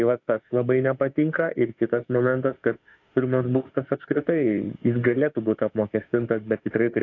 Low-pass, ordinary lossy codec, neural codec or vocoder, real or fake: 7.2 kHz; AAC, 48 kbps; autoencoder, 48 kHz, 32 numbers a frame, DAC-VAE, trained on Japanese speech; fake